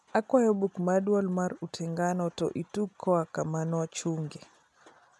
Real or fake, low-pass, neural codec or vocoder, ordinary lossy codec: real; none; none; none